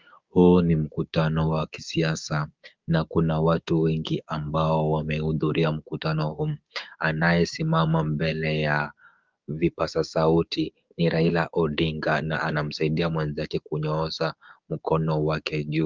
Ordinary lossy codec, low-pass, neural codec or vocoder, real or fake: Opus, 32 kbps; 7.2 kHz; codec, 16 kHz, 6 kbps, DAC; fake